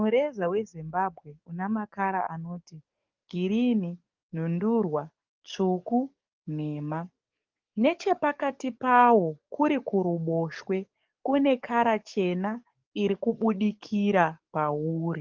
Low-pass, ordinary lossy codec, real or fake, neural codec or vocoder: 7.2 kHz; Opus, 32 kbps; fake; codec, 44.1 kHz, 7.8 kbps, DAC